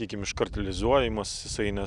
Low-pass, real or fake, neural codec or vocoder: 10.8 kHz; real; none